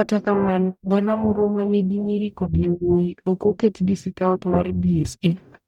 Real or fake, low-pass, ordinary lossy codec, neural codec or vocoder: fake; 19.8 kHz; none; codec, 44.1 kHz, 0.9 kbps, DAC